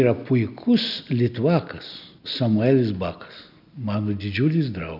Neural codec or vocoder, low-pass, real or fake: none; 5.4 kHz; real